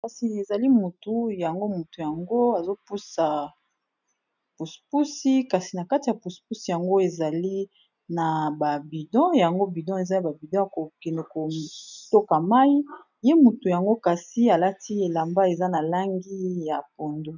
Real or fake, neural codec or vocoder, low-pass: real; none; 7.2 kHz